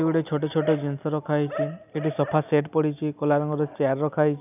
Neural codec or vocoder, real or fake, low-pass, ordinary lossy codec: none; real; 3.6 kHz; none